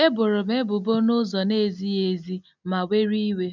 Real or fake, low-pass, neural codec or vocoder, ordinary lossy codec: real; 7.2 kHz; none; none